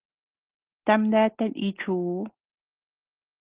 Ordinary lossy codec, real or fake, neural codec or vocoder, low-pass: Opus, 16 kbps; real; none; 3.6 kHz